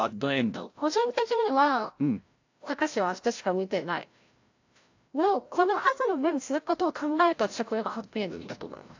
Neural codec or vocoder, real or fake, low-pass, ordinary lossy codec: codec, 16 kHz, 0.5 kbps, FreqCodec, larger model; fake; 7.2 kHz; AAC, 48 kbps